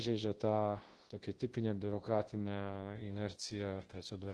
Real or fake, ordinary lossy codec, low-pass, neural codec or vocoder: fake; Opus, 16 kbps; 10.8 kHz; codec, 24 kHz, 0.9 kbps, WavTokenizer, large speech release